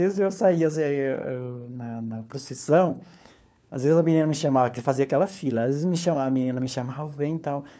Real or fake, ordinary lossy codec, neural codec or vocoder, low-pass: fake; none; codec, 16 kHz, 4 kbps, FunCodec, trained on LibriTTS, 50 frames a second; none